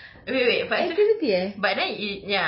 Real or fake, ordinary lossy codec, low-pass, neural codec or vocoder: real; MP3, 24 kbps; 7.2 kHz; none